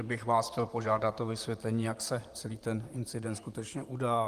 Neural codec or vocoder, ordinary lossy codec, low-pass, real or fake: vocoder, 44.1 kHz, 128 mel bands, Pupu-Vocoder; Opus, 32 kbps; 14.4 kHz; fake